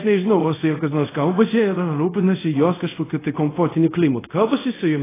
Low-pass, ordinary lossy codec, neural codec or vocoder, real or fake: 3.6 kHz; AAC, 16 kbps; codec, 24 kHz, 0.5 kbps, DualCodec; fake